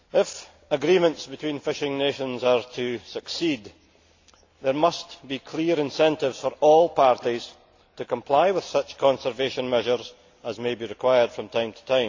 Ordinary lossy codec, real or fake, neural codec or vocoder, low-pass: AAC, 48 kbps; real; none; 7.2 kHz